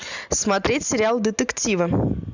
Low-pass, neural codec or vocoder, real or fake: 7.2 kHz; none; real